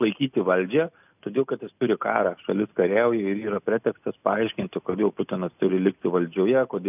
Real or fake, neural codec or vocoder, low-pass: real; none; 3.6 kHz